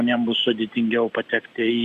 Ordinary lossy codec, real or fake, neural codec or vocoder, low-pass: Opus, 64 kbps; real; none; 14.4 kHz